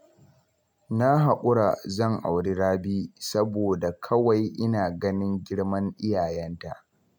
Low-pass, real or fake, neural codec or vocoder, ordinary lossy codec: 19.8 kHz; real; none; none